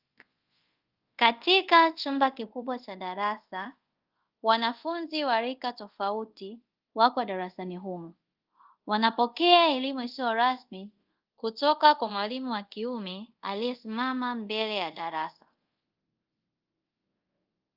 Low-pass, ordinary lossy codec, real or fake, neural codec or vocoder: 5.4 kHz; Opus, 32 kbps; fake; codec, 24 kHz, 0.5 kbps, DualCodec